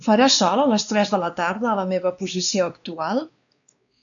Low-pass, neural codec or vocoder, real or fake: 7.2 kHz; codec, 16 kHz, 2 kbps, X-Codec, WavLM features, trained on Multilingual LibriSpeech; fake